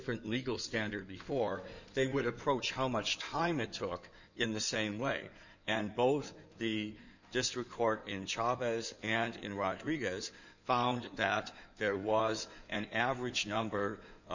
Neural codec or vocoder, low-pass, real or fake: codec, 16 kHz in and 24 kHz out, 2.2 kbps, FireRedTTS-2 codec; 7.2 kHz; fake